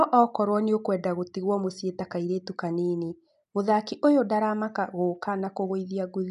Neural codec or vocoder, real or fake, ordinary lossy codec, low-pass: none; real; none; 10.8 kHz